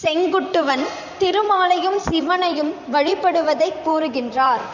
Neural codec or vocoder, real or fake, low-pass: vocoder, 44.1 kHz, 128 mel bands, Pupu-Vocoder; fake; 7.2 kHz